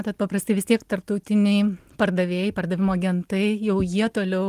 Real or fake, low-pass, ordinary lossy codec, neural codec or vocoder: real; 14.4 kHz; Opus, 24 kbps; none